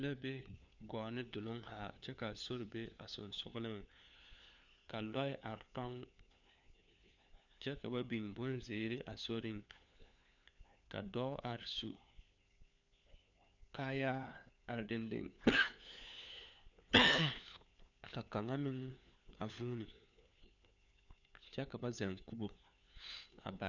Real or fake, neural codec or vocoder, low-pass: fake; codec, 16 kHz, 4 kbps, FunCodec, trained on LibriTTS, 50 frames a second; 7.2 kHz